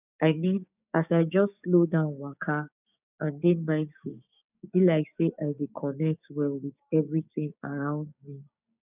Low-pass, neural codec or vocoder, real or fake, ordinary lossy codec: 3.6 kHz; codec, 16 kHz, 6 kbps, DAC; fake; none